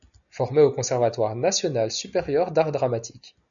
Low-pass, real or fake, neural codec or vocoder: 7.2 kHz; real; none